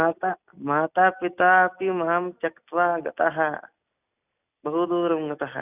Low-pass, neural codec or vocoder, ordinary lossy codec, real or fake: 3.6 kHz; none; none; real